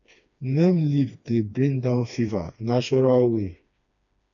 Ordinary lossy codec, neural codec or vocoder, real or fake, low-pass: none; codec, 16 kHz, 2 kbps, FreqCodec, smaller model; fake; 7.2 kHz